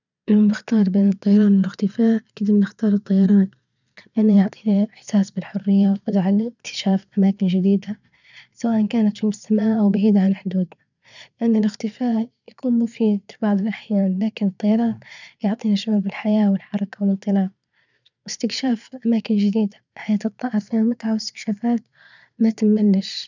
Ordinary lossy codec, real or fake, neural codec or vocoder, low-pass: none; fake; vocoder, 22.05 kHz, 80 mel bands, Vocos; 7.2 kHz